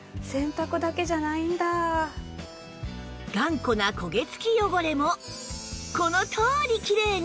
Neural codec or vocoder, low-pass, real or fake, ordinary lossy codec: none; none; real; none